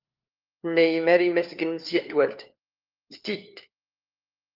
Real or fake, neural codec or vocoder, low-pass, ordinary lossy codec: fake; codec, 16 kHz, 4 kbps, FunCodec, trained on LibriTTS, 50 frames a second; 5.4 kHz; Opus, 32 kbps